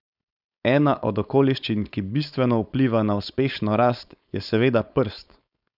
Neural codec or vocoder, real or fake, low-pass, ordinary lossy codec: codec, 16 kHz, 4.8 kbps, FACodec; fake; 5.4 kHz; none